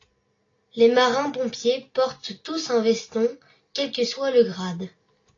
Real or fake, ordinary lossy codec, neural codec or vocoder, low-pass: real; AAC, 32 kbps; none; 7.2 kHz